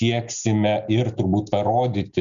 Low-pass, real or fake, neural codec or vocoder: 7.2 kHz; real; none